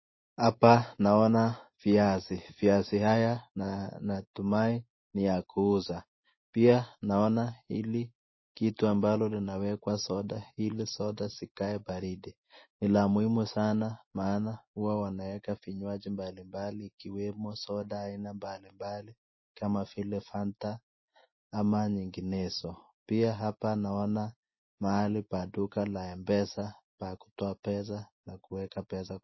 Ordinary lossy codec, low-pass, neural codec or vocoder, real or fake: MP3, 24 kbps; 7.2 kHz; none; real